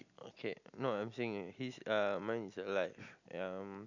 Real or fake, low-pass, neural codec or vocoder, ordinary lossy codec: real; 7.2 kHz; none; none